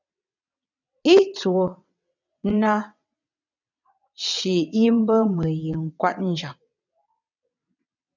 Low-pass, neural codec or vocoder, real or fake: 7.2 kHz; vocoder, 22.05 kHz, 80 mel bands, WaveNeXt; fake